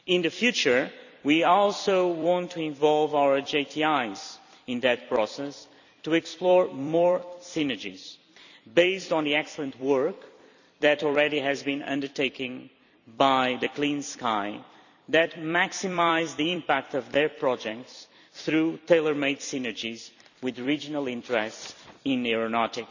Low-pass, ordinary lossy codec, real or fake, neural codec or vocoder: 7.2 kHz; none; fake; vocoder, 44.1 kHz, 128 mel bands every 256 samples, BigVGAN v2